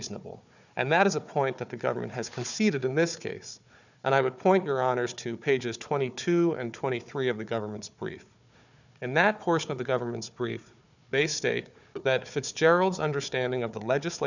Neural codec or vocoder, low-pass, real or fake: codec, 16 kHz, 4 kbps, FunCodec, trained on Chinese and English, 50 frames a second; 7.2 kHz; fake